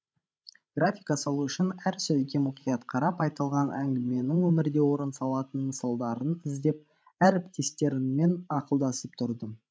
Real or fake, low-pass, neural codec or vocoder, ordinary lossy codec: fake; none; codec, 16 kHz, 16 kbps, FreqCodec, larger model; none